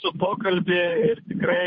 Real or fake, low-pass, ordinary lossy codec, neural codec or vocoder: fake; 7.2 kHz; MP3, 32 kbps; codec, 16 kHz, 8 kbps, FunCodec, trained on Chinese and English, 25 frames a second